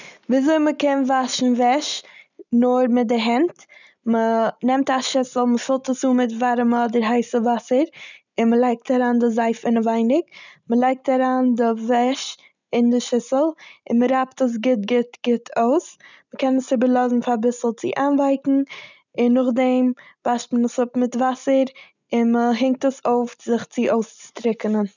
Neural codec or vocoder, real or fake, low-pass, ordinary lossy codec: none; real; 7.2 kHz; none